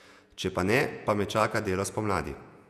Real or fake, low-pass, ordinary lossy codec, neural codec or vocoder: fake; 14.4 kHz; none; vocoder, 48 kHz, 128 mel bands, Vocos